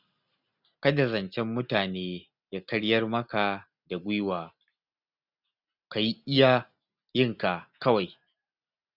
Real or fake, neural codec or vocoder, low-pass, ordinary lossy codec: real; none; 5.4 kHz; none